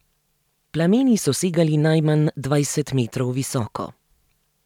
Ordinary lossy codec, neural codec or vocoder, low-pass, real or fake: none; vocoder, 44.1 kHz, 128 mel bands every 512 samples, BigVGAN v2; 19.8 kHz; fake